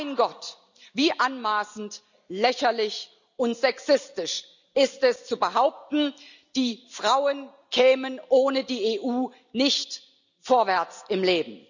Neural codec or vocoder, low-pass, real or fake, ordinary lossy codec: none; 7.2 kHz; real; none